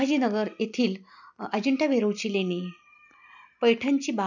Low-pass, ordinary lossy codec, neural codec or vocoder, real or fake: 7.2 kHz; none; none; real